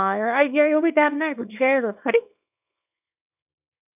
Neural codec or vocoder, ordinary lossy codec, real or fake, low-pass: codec, 24 kHz, 0.9 kbps, WavTokenizer, small release; none; fake; 3.6 kHz